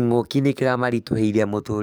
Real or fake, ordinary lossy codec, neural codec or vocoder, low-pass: fake; none; codec, 44.1 kHz, 7.8 kbps, DAC; none